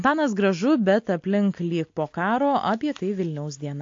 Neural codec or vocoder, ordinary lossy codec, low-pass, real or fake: none; AAC, 48 kbps; 7.2 kHz; real